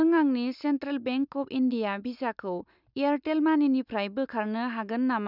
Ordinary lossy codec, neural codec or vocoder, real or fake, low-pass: none; none; real; 5.4 kHz